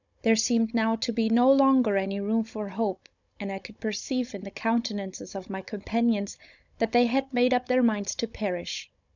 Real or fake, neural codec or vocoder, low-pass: fake; codec, 16 kHz, 16 kbps, FunCodec, trained on Chinese and English, 50 frames a second; 7.2 kHz